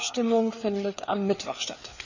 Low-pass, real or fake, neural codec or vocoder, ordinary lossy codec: 7.2 kHz; fake; codec, 16 kHz, 4 kbps, FreqCodec, larger model; none